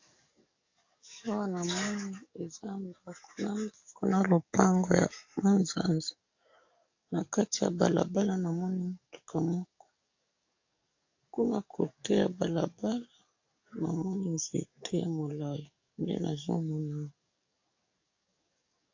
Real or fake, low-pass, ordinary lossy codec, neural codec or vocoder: fake; 7.2 kHz; AAC, 48 kbps; codec, 44.1 kHz, 7.8 kbps, DAC